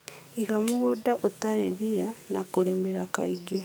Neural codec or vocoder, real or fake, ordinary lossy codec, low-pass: codec, 44.1 kHz, 2.6 kbps, SNAC; fake; none; none